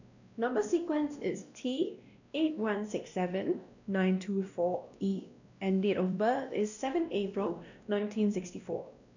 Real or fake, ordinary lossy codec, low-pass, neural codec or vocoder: fake; none; 7.2 kHz; codec, 16 kHz, 1 kbps, X-Codec, WavLM features, trained on Multilingual LibriSpeech